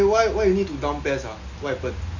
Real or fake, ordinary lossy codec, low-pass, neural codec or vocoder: real; none; 7.2 kHz; none